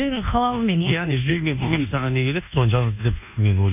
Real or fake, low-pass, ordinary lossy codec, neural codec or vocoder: fake; 3.6 kHz; none; codec, 24 kHz, 1.2 kbps, DualCodec